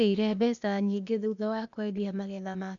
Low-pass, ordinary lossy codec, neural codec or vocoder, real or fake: 7.2 kHz; none; codec, 16 kHz, 0.8 kbps, ZipCodec; fake